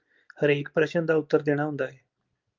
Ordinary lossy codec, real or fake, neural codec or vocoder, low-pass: Opus, 32 kbps; real; none; 7.2 kHz